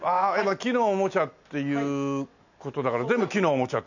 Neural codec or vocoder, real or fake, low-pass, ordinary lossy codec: none; real; 7.2 kHz; none